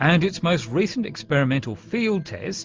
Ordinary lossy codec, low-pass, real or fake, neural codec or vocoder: Opus, 32 kbps; 7.2 kHz; real; none